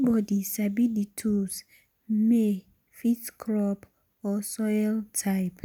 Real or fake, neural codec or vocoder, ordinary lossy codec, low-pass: real; none; none; 19.8 kHz